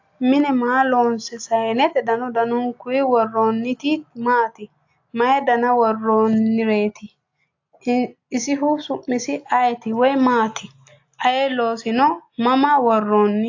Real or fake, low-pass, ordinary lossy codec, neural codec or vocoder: real; 7.2 kHz; AAC, 48 kbps; none